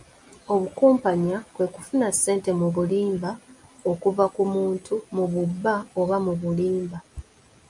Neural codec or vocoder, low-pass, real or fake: none; 10.8 kHz; real